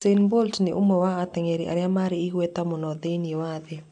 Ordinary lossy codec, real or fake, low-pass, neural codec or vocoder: MP3, 96 kbps; real; 9.9 kHz; none